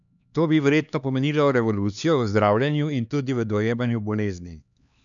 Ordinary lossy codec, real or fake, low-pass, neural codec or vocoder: none; fake; 7.2 kHz; codec, 16 kHz, 2 kbps, X-Codec, HuBERT features, trained on LibriSpeech